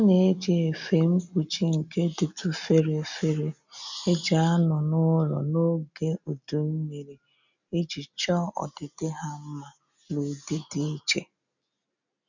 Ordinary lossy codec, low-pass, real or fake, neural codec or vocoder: none; 7.2 kHz; real; none